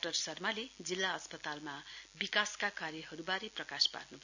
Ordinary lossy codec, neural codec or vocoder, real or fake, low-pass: none; none; real; 7.2 kHz